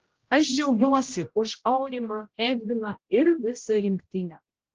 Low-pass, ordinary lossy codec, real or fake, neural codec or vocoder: 7.2 kHz; Opus, 16 kbps; fake; codec, 16 kHz, 0.5 kbps, X-Codec, HuBERT features, trained on general audio